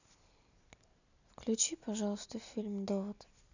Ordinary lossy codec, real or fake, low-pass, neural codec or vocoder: none; real; 7.2 kHz; none